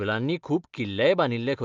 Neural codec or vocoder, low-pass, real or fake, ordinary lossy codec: none; 7.2 kHz; real; Opus, 32 kbps